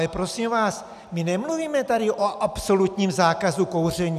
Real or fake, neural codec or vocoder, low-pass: real; none; 14.4 kHz